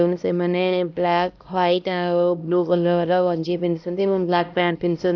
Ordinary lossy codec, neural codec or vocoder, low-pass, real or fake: none; codec, 16 kHz, 1 kbps, X-Codec, HuBERT features, trained on LibriSpeech; 7.2 kHz; fake